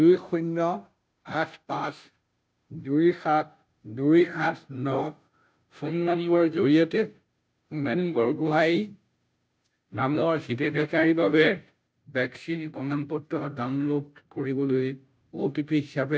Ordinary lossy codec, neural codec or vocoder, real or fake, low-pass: none; codec, 16 kHz, 0.5 kbps, FunCodec, trained on Chinese and English, 25 frames a second; fake; none